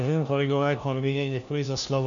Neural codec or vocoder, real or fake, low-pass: codec, 16 kHz, 1 kbps, FunCodec, trained on LibriTTS, 50 frames a second; fake; 7.2 kHz